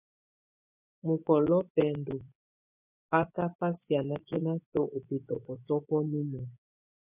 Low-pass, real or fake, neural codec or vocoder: 3.6 kHz; fake; vocoder, 24 kHz, 100 mel bands, Vocos